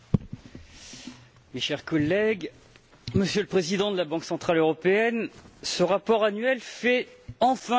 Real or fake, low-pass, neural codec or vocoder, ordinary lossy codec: real; none; none; none